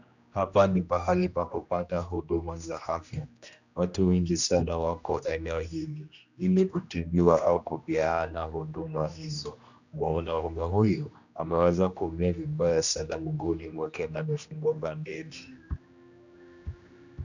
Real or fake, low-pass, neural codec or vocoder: fake; 7.2 kHz; codec, 16 kHz, 1 kbps, X-Codec, HuBERT features, trained on general audio